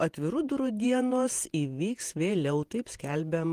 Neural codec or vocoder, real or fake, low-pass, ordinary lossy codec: vocoder, 48 kHz, 128 mel bands, Vocos; fake; 14.4 kHz; Opus, 24 kbps